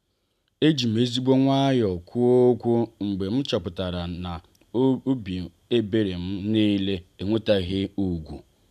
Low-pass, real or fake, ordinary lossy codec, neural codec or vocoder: 14.4 kHz; real; none; none